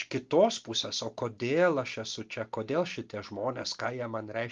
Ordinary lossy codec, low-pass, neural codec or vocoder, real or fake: Opus, 32 kbps; 7.2 kHz; none; real